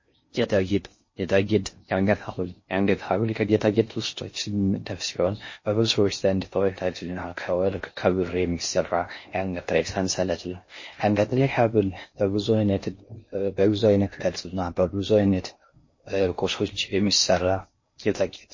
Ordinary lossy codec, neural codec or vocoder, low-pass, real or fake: MP3, 32 kbps; codec, 16 kHz in and 24 kHz out, 0.6 kbps, FocalCodec, streaming, 4096 codes; 7.2 kHz; fake